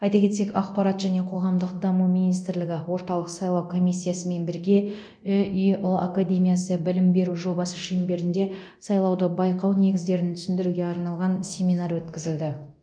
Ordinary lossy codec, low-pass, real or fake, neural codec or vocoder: none; 9.9 kHz; fake; codec, 24 kHz, 0.9 kbps, DualCodec